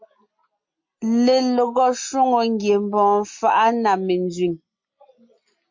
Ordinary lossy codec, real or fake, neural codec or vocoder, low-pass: MP3, 48 kbps; real; none; 7.2 kHz